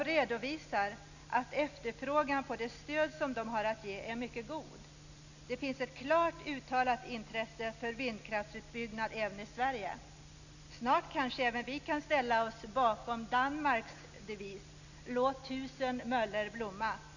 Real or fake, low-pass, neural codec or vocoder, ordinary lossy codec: real; 7.2 kHz; none; none